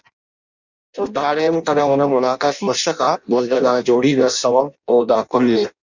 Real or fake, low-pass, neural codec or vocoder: fake; 7.2 kHz; codec, 16 kHz in and 24 kHz out, 0.6 kbps, FireRedTTS-2 codec